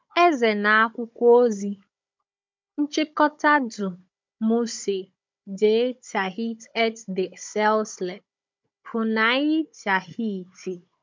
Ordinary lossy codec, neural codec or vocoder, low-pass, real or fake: MP3, 64 kbps; codec, 16 kHz, 16 kbps, FunCodec, trained on Chinese and English, 50 frames a second; 7.2 kHz; fake